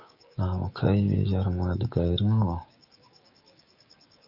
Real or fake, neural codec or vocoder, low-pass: fake; codec, 16 kHz, 6 kbps, DAC; 5.4 kHz